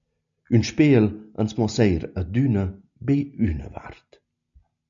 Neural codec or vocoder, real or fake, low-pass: none; real; 7.2 kHz